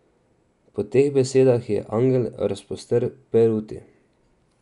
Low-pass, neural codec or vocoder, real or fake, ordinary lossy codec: 10.8 kHz; none; real; none